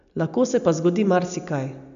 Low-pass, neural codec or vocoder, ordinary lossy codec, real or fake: 7.2 kHz; none; none; real